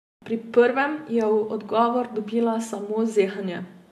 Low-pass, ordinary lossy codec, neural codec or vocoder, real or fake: 14.4 kHz; AAC, 64 kbps; none; real